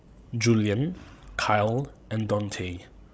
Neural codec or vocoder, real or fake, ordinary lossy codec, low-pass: codec, 16 kHz, 16 kbps, FreqCodec, larger model; fake; none; none